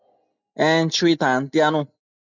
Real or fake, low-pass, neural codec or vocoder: real; 7.2 kHz; none